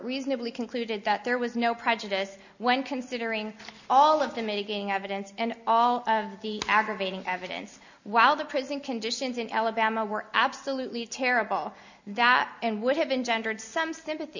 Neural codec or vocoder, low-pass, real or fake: none; 7.2 kHz; real